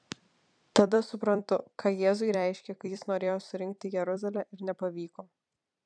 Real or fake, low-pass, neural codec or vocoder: fake; 9.9 kHz; vocoder, 44.1 kHz, 128 mel bands every 256 samples, BigVGAN v2